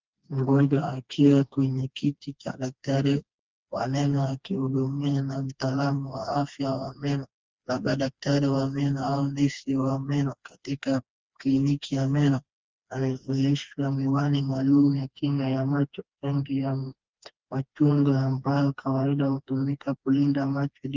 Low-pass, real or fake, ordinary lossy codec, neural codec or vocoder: 7.2 kHz; fake; Opus, 32 kbps; codec, 16 kHz, 2 kbps, FreqCodec, smaller model